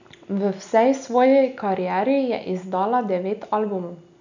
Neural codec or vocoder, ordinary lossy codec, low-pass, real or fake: none; none; 7.2 kHz; real